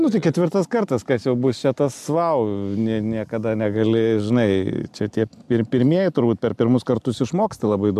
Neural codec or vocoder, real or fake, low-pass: none; real; 14.4 kHz